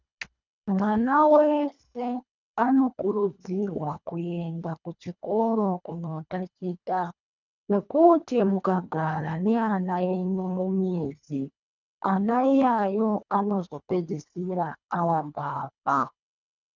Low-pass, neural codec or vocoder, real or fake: 7.2 kHz; codec, 24 kHz, 1.5 kbps, HILCodec; fake